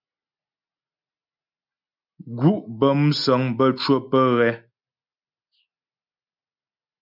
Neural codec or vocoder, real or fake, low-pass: none; real; 5.4 kHz